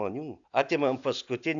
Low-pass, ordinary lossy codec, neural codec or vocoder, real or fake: 7.2 kHz; AAC, 64 kbps; none; real